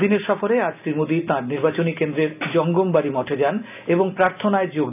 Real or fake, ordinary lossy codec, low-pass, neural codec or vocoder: real; none; 3.6 kHz; none